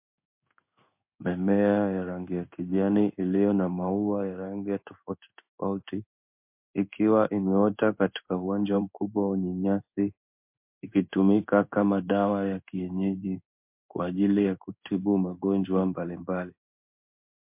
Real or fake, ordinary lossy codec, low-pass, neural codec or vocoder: fake; MP3, 32 kbps; 3.6 kHz; codec, 16 kHz in and 24 kHz out, 1 kbps, XY-Tokenizer